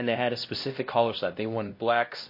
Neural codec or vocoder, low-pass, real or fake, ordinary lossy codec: codec, 16 kHz, 1 kbps, X-Codec, HuBERT features, trained on LibriSpeech; 5.4 kHz; fake; MP3, 32 kbps